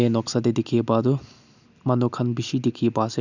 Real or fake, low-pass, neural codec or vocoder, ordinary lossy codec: real; 7.2 kHz; none; none